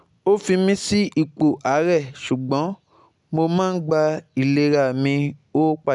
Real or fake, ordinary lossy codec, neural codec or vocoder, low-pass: real; none; none; 10.8 kHz